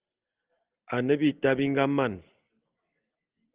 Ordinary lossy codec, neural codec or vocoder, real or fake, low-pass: Opus, 32 kbps; none; real; 3.6 kHz